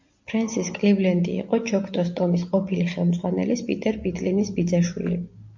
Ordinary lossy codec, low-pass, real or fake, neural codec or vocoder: MP3, 48 kbps; 7.2 kHz; real; none